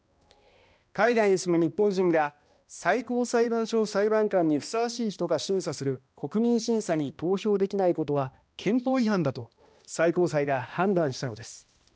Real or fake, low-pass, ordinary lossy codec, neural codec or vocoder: fake; none; none; codec, 16 kHz, 1 kbps, X-Codec, HuBERT features, trained on balanced general audio